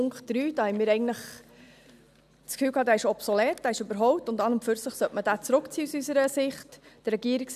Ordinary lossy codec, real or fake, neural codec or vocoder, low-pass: none; real; none; 14.4 kHz